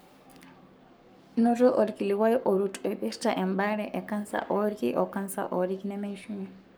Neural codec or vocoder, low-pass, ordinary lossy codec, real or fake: codec, 44.1 kHz, 7.8 kbps, Pupu-Codec; none; none; fake